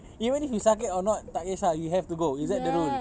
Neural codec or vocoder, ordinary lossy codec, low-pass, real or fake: none; none; none; real